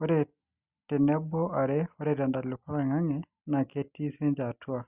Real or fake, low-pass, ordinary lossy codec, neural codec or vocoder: real; 3.6 kHz; Opus, 64 kbps; none